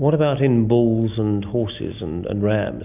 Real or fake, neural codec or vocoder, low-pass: real; none; 3.6 kHz